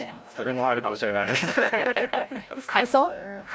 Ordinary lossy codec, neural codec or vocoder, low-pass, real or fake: none; codec, 16 kHz, 0.5 kbps, FreqCodec, larger model; none; fake